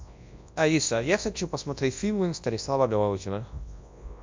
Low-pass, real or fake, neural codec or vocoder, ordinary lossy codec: 7.2 kHz; fake; codec, 24 kHz, 0.9 kbps, WavTokenizer, large speech release; MP3, 64 kbps